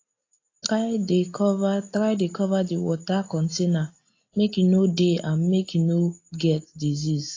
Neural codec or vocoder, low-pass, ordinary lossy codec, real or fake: none; 7.2 kHz; AAC, 32 kbps; real